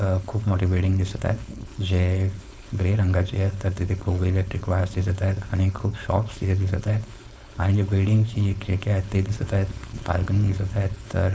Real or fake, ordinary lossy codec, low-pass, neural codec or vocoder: fake; none; none; codec, 16 kHz, 4.8 kbps, FACodec